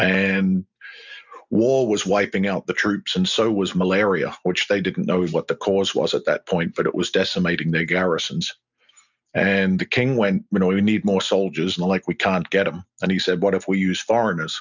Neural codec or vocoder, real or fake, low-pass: none; real; 7.2 kHz